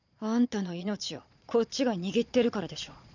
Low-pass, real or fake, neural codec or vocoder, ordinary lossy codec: 7.2 kHz; fake; vocoder, 22.05 kHz, 80 mel bands, Vocos; none